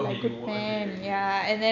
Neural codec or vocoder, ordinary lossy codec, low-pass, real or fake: none; none; 7.2 kHz; real